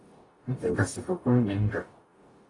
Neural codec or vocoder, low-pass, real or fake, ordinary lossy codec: codec, 44.1 kHz, 0.9 kbps, DAC; 10.8 kHz; fake; AAC, 32 kbps